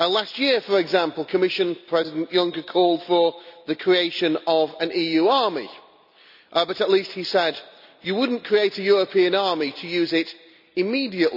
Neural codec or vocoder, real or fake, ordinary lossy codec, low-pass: none; real; none; 5.4 kHz